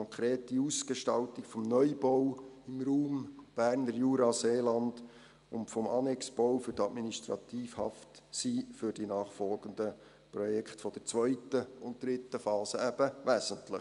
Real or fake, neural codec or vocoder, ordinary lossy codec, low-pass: real; none; none; 10.8 kHz